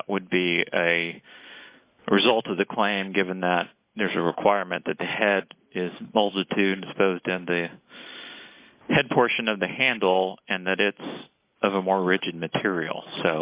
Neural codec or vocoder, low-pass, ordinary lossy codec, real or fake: none; 3.6 kHz; Opus, 64 kbps; real